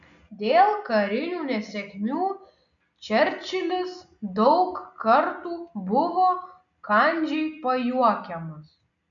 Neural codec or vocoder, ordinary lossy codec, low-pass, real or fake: none; MP3, 96 kbps; 7.2 kHz; real